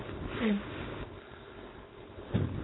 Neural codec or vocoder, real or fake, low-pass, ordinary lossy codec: codec, 16 kHz, 4.8 kbps, FACodec; fake; 7.2 kHz; AAC, 16 kbps